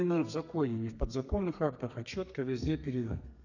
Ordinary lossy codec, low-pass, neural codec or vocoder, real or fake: none; 7.2 kHz; codec, 32 kHz, 1.9 kbps, SNAC; fake